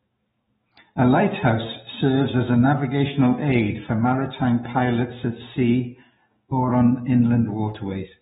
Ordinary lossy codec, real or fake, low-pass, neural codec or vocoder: AAC, 16 kbps; fake; 19.8 kHz; codec, 44.1 kHz, 7.8 kbps, DAC